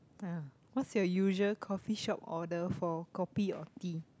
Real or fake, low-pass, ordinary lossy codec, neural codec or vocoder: real; none; none; none